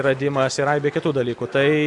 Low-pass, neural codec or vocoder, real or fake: 10.8 kHz; none; real